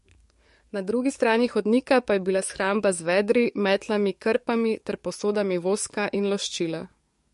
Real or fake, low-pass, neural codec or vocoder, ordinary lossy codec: fake; 14.4 kHz; codec, 44.1 kHz, 7.8 kbps, DAC; MP3, 48 kbps